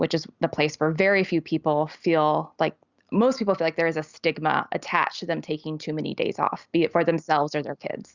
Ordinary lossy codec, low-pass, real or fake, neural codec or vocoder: Opus, 64 kbps; 7.2 kHz; real; none